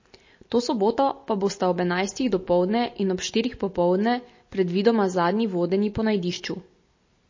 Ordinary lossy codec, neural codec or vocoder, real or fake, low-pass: MP3, 32 kbps; none; real; 7.2 kHz